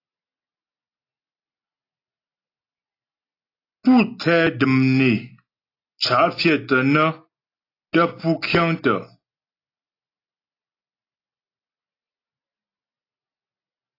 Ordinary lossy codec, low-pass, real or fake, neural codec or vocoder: AAC, 32 kbps; 5.4 kHz; real; none